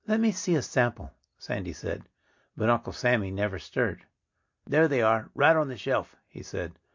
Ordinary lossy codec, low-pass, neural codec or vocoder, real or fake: MP3, 48 kbps; 7.2 kHz; none; real